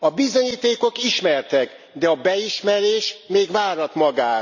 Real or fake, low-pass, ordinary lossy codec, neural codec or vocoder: real; 7.2 kHz; none; none